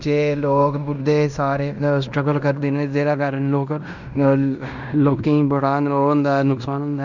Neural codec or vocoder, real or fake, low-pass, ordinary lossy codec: codec, 16 kHz in and 24 kHz out, 0.9 kbps, LongCat-Audio-Codec, fine tuned four codebook decoder; fake; 7.2 kHz; none